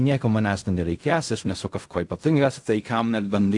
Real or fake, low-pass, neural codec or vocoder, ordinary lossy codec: fake; 10.8 kHz; codec, 16 kHz in and 24 kHz out, 0.4 kbps, LongCat-Audio-Codec, fine tuned four codebook decoder; AAC, 64 kbps